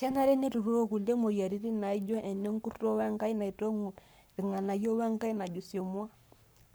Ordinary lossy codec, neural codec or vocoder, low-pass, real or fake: none; codec, 44.1 kHz, 7.8 kbps, Pupu-Codec; none; fake